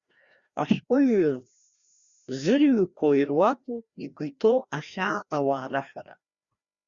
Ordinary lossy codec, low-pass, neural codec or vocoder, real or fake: Opus, 64 kbps; 7.2 kHz; codec, 16 kHz, 1 kbps, FreqCodec, larger model; fake